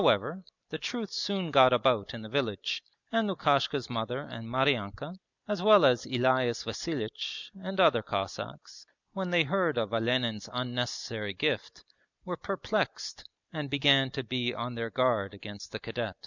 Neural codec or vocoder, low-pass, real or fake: none; 7.2 kHz; real